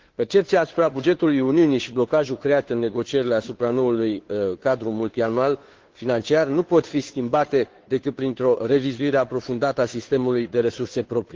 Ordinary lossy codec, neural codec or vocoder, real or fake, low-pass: Opus, 16 kbps; codec, 16 kHz, 2 kbps, FunCodec, trained on Chinese and English, 25 frames a second; fake; 7.2 kHz